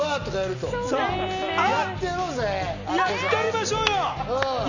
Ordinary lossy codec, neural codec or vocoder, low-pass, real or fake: none; none; 7.2 kHz; real